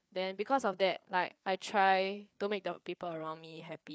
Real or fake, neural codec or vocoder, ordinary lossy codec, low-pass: fake; codec, 16 kHz, 4 kbps, FreqCodec, larger model; none; none